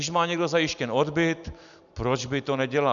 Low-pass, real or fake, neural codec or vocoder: 7.2 kHz; real; none